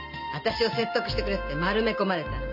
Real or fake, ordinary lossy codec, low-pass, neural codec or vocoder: real; none; 5.4 kHz; none